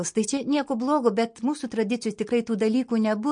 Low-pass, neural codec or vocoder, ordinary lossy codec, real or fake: 9.9 kHz; none; MP3, 48 kbps; real